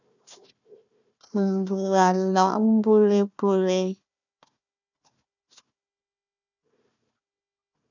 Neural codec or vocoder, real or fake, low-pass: codec, 16 kHz, 1 kbps, FunCodec, trained on Chinese and English, 50 frames a second; fake; 7.2 kHz